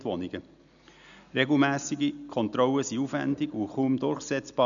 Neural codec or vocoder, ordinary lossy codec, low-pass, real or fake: none; none; 7.2 kHz; real